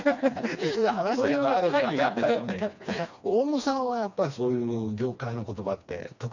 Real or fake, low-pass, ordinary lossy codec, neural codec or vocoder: fake; 7.2 kHz; none; codec, 16 kHz, 2 kbps, FreqCodec, smaller model